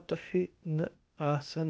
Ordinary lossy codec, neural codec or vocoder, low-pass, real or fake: none; codec, 16 kHz, 0.8 kbps, ZipCodec; none; fake